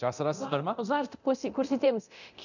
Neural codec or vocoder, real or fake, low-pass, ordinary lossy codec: codec, 24 kHz, 0.9 kbps, DualCodec; fake; 7.2 kHz; none